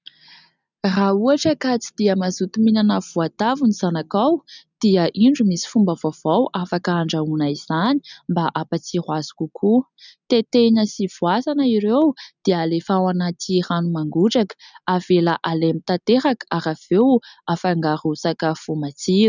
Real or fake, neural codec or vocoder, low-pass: real; none; 7.2 kHz